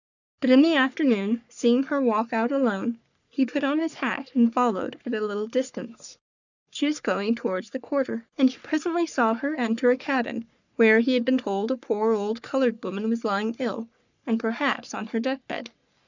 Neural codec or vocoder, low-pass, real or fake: codec, 44.1 kHz, 3.4 kbps, Pupu-Codec; 7.2 kHz; fake